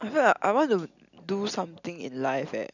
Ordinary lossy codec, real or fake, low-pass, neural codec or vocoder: none; real; 7.2 kHz; none